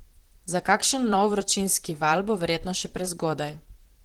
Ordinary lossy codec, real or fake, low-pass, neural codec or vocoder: Opus, 16 kbps; fake; 19.8 kHz; vocoder, 44.1 kHz, 128 mel bands, Pupu-Vocoder